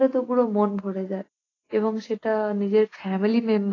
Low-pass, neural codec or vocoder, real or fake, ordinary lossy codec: 7.2 kHz; none; real; AAC, 32 kbps